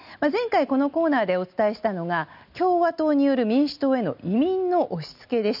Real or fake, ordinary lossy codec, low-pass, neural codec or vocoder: real; none; 5.4 kHz; none